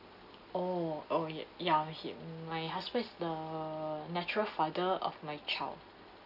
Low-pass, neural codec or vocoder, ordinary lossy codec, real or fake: 5.4 kHz; none; none; real